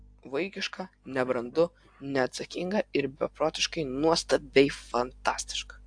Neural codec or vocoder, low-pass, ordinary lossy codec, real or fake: none; 9.9 kHz; AAC, 64 kbps; real